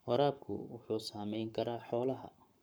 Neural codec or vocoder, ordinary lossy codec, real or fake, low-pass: codec, 44.1 kHz, 7.8 kbps, Pupu-Codec; none; fake; none